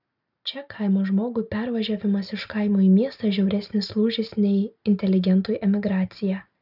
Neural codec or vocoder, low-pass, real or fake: none; 5.4 kHz; real